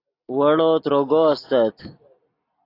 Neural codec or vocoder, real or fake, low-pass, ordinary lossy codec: none; real; 5.4 kHz; AAC, 32 kbps